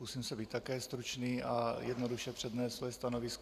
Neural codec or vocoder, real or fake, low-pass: vocoder, 44.1 kHz, 128 mel bands every 512 samples, BigVGAN v2; fake; 14.4 kHz